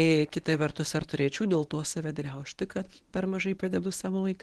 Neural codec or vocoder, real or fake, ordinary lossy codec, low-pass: codec, 24 kHz, 0.9 kbps, WavTokenizer, medium speech release version 1; fake; Opus, 16 kbps; 10.8 kHz